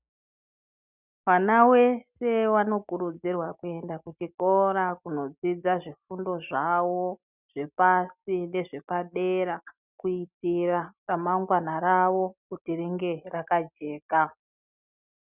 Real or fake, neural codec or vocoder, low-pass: real; none; 3.6 kHz